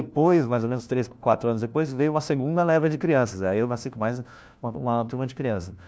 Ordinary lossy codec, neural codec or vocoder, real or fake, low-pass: none; codec, 16 kHz, 1 kbps, FunCodec, trained on LibriTTS, 50 frames a second; fake; none